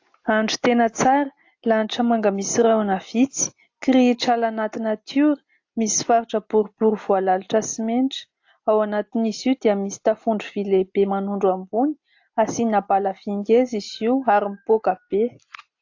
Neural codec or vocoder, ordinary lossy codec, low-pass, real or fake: none; AAC, 48 kbps; 7.2 kHz; real